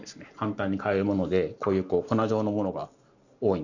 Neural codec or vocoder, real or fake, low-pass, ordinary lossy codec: none; real; 7.2 kHz; none